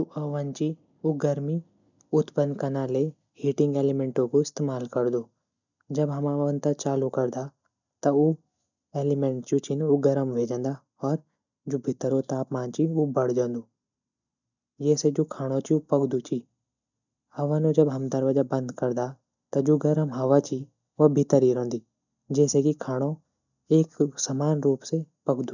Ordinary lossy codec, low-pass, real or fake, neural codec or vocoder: none; 7.2 kHz; real; none